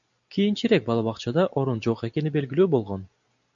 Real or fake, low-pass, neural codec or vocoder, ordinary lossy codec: real; 7.2 kHz; none; MP3, 96 kbps